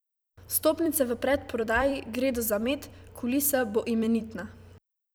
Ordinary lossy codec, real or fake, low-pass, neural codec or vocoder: none; fake; none; vocoder, 44.1 kHz, 128 mel bands every 256 samples, BigVGAN v2